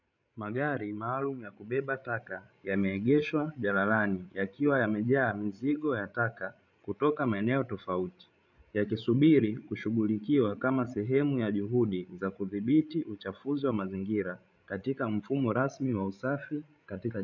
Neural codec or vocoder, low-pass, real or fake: codec, 16 kHz, 16 kbps, FreqCodec, larger model; 7.2 kHz; fake